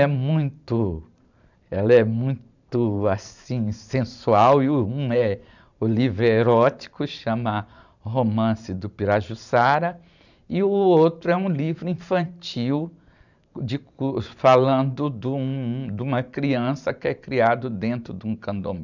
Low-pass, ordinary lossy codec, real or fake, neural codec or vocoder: 7.2 kHz; none; fake; vocoder, 44.1 kHz, 128 mel bands every 512 samples, BigVGAN v2